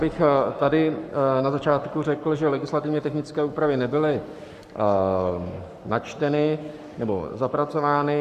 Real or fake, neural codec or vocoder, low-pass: fake; codec, 44.1 kHz, 7.8 kbps, Pupu-Codec; 14.4 kHz